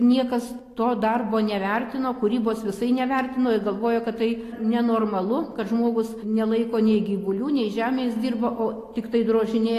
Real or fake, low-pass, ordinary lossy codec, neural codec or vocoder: real; 14.4 kHz; AAC, 48 kbps; none